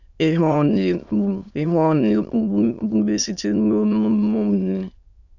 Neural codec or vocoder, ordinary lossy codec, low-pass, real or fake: autoencoder, 22.05 kHz, a latent of 192 numbers a frame, VITS, trained on many speakers; none; 7.2 kHz; fake